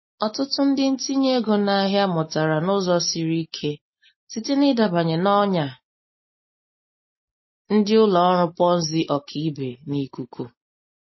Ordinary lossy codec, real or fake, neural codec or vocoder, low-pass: MP3, 24 kbps; real; none; 7.2 kHz